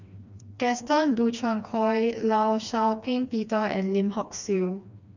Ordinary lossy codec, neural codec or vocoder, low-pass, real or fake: none; codec, 16 kHz, 2 kbps, FreqCodec, smaller model; 7.2 kHz; fake